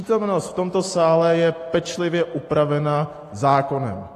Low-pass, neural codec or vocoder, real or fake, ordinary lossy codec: 14.4 kHz; none; real; AAC, 48 kbps